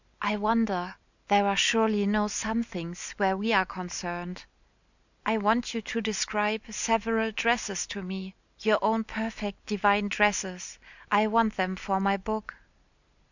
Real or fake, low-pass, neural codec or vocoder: real; 7.2 kHz; none